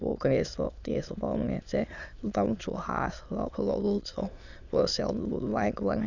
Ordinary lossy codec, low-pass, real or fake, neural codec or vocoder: none; 7.2 kHz; fake; autoencoder, 22.05 kHz, a latent of 192 numbers a frame, VITS, trained on many speakers